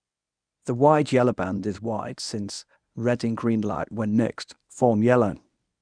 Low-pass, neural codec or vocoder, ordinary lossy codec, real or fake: 9.9 kHz; codec, 24 kHz, 0.9 kbps, WavTokenizer, medium speech release version 1; none; fake